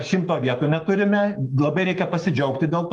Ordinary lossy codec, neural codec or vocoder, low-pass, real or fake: Opus, 32 kbps; codec, 44.1 kHz, 7.8 kbps, Pupu-Codec; 10.8 kHz; fake